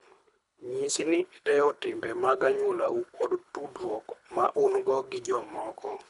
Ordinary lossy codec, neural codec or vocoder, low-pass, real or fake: none; codec, 24 kHz, 3 kbps, HILCodec; 10.8 kHz; fake